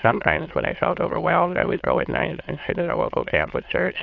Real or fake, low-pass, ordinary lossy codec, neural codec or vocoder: fake; 7.2 kHz; AAC, 48 kbps; autoencoder, 22.05 kHz, a latent of 192 numbers a frame, VITS, trained on many speakers